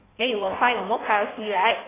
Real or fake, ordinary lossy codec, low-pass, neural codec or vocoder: fake; AAC, 16 kbps; 3.6 kHz; codec, 16 kHz in and 24 kHz out, 1.1 kbps, FireRedTTS-2 codec